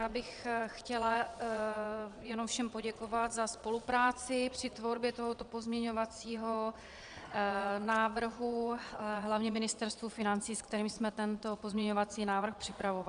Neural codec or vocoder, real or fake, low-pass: vocoder, 22.05 kHz, 80 mel bands, Vocos; fake; 9.9 kHz